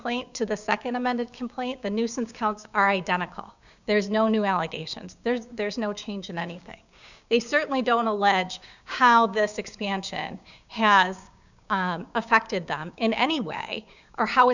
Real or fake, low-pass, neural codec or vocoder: fake; 7.2 kHz; codec, 16 kHz, 6 kbps, DAC